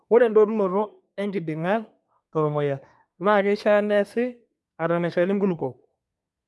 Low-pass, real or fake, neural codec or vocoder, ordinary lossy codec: none; fake; codec, 24 kHz, 1 kbps, SNAC; none